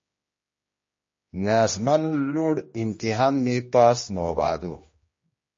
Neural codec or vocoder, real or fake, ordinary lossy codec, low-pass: codec, 16 kHz, 1 kbps, X-Codec, HuBERT features, trained on general audio; fake; MP3, 32 kbps; 7.2 kHz